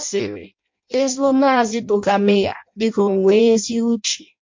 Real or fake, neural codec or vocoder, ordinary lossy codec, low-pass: fake; codec, 16 kHz in and 24 kHz out, 0.6 kbps, FireRedTTS-2 codec; MP3, 48 kbps; 7.2 kHz